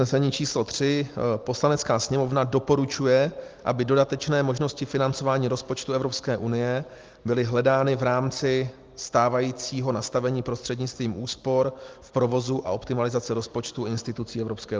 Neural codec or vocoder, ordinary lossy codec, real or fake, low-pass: none; Opus, 32 kbps; real; 7.2 kHz